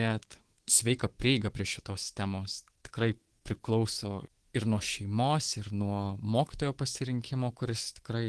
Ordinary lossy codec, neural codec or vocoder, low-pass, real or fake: Opus, 16 kbps; codec, 24 kHz, 3.1 kbps, DualCodec; 10.8 kHz; fake